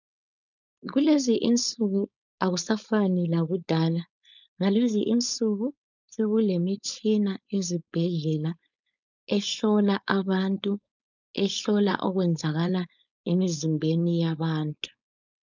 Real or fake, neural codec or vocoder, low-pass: fake; codec, 16 kHz, 4.8 kbps, FACodec; 7.2 kHz